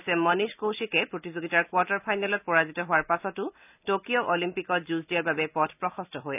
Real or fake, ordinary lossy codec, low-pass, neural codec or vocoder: real; none; 3.6 kHz; none